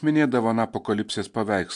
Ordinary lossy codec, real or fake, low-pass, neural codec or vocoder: MP3, 64 kbps; real; 10.8 kHz; none